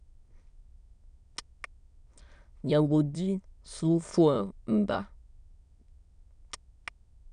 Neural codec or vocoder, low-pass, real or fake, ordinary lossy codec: autoencoder, 22.05 kHz, a latent of 192 numbers a frame, VITS, trained on many speakers; 9.9 kHz; fake; none